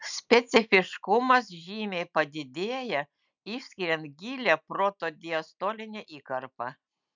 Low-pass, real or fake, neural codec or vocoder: 7.2 kHz; real; none